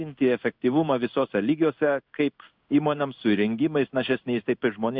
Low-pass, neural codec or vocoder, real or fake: 5.4 kHz; codec, 16 kHz in and 24 kHz out, 1 kbps, XY-Tokenizer; fake